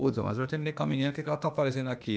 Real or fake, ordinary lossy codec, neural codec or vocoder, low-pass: fake; none; codec, 16 kHz, 0.8 kbps, ZipCodec; none